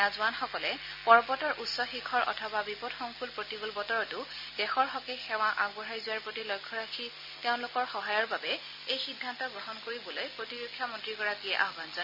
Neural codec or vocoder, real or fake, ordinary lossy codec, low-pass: none; real; MP3, 32 kbps; 5.4 kHz